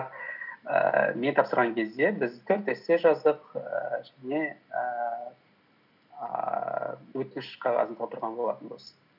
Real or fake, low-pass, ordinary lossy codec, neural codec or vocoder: real; 5.4 kHz; none; none